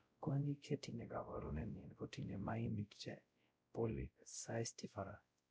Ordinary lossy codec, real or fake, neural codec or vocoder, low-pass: none; fake; codec, 16 kHz, 0.5 kbps, X-Codec, WavLM features, trained on Multilingual LibriSpeech; none